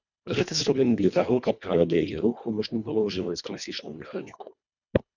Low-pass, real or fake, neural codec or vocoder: 7.2 kHz; fake; codec, 24 kHz, 1.5 kbps, HILCodec